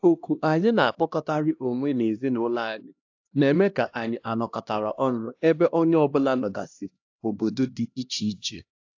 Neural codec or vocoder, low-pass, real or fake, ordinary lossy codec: codec, 16 kHz, 1 kbps, X-Codec, HuBERT features, trained on LibriSpeech; 7.2 kHz; fake; AAC, 48 kbps